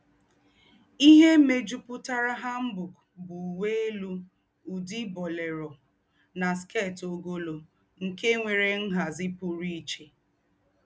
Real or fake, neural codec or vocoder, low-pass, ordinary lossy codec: real; none; none; none